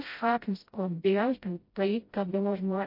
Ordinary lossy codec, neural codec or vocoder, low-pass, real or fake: MP3, 32 kbps; codec, 16 kHz, 0.5 kbps, FreqCodec, smaller model; 5.4 kHz; fake